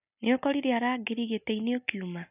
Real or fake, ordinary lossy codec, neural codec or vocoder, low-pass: real; none; none; 3.6 kHz